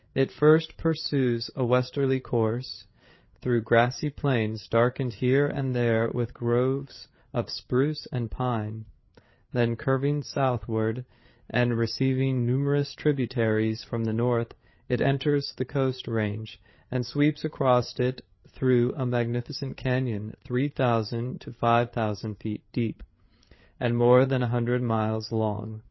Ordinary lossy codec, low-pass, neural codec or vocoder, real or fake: MP3, 24 kbps; 7.2 kHz; codec, 16 kHz, 8 kbps, FreqCodec, larger model; fake